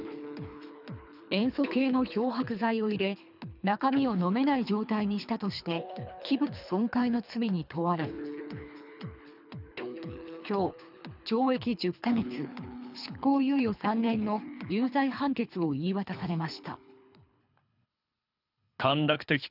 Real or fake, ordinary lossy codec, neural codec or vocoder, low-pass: fake; none; codec, 24 kHz, 3 kbps, HILCodec; 5.4 kHz